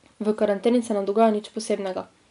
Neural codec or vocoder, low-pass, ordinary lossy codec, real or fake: none; 10.8 kHz; MP3, 96 kbps; real